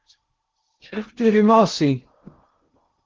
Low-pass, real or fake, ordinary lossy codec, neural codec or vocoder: 7.2 kHz; fake; Opus, 16 kbps; codec, 16 kHz in and 24 kHz out, 0.6 kbps, FocalCodec, streaming, 2048 codes